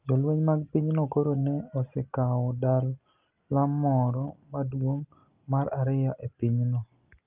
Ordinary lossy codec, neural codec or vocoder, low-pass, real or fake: Opus, 32 kbps; none; 3.6 kHz; real